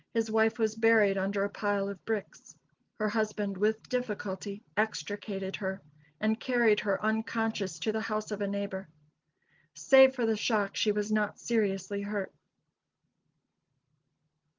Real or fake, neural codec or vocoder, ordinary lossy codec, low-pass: real; none; Opus, 32 kbps; 7.2 kHz